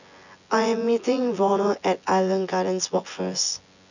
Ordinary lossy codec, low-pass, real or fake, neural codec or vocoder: none; 7.2 kHz; fake; vocoder, 24 kHz, 100 mel bands, Vocos